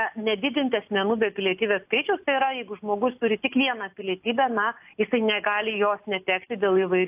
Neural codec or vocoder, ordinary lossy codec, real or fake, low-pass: none; AAC, 32 kbps; real; 3.6 kHz